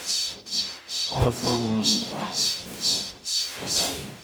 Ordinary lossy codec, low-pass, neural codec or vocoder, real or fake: none; none; codec, 44.1 kHz, 0.9 kbps, DAC; fake